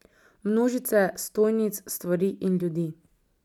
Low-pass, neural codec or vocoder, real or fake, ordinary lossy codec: 19.8 kHz; none; real; none